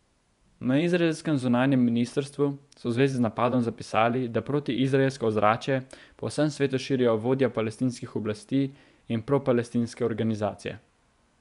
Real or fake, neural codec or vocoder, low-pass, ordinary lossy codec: fake; vocoder, 24 kHz, 100 mel bands, Vocos; 10.8 kHz; none